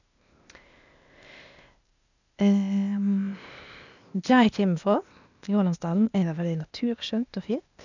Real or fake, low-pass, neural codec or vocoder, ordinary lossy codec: fake; 7.2 kHz; codec, 16 kHz, 0.8 kbps, ZipCodec; none